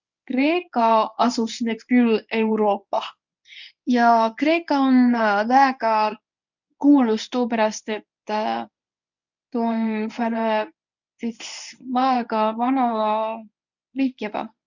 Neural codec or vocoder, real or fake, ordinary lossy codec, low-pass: codec, 24 kHz, 0.9 kbps, WavTokenizer, medium speech release version 1; fake; none; 7.2 kHz